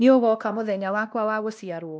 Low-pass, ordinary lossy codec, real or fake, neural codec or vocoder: none; none; fake; codec, 16 kHz, 1 kbps, X-Codec, WavLM features, trained on Multilingual LibriSpeech